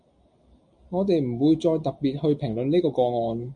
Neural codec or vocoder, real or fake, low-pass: none; real; 9.9 kHz